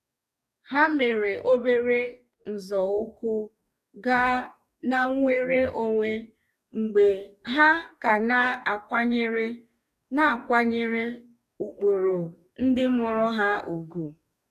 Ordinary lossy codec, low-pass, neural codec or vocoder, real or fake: none; 14.4 kHz; codec, 44.1 kHz, 2.6 kbps, DAC; fake